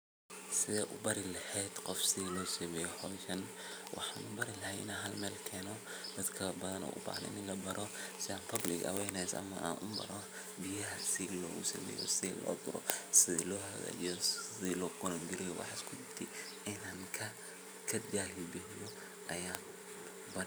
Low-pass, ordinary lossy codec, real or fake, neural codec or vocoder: none; none; fake; vocoder, 44.1 kHz, 128 mel bands every 512 samples, BigVGAN v2